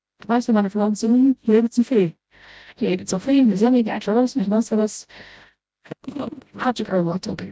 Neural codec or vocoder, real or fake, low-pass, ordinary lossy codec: codec, 16 kHz, 0.5 kbps, FreqCodec, smaller model; fake; none; none